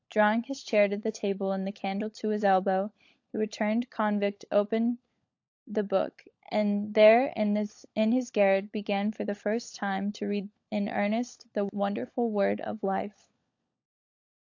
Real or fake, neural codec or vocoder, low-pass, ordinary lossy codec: fake; codec, 16 kHz, 16 kbps, FunCodec, trained on LibriTTS, 50 frames a second; 7.2 kHz; AAC, 48 kbps